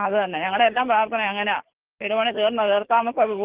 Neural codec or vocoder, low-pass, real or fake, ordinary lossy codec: codec, 44.1 kHz, 7.8 kbps, DAC; 3.6 kHz; fake; Opus, 16 kbps